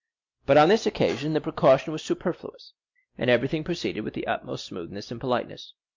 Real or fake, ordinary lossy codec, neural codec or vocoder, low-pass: real; MP3, 48 kbps; none; 7.2 kHz